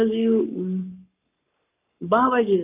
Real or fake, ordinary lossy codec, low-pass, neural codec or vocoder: fake; none; 3.6 kHz; codec, 44.1 kHz, 7.8 kbps, Pupu-Codec